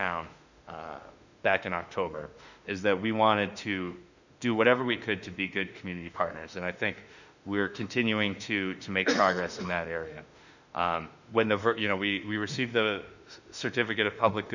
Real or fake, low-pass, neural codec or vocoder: fake; 7.2 kHz; autoencoder, 48 kHz, 32 numbers a frame, DAC-VAE, trained on Japanese speech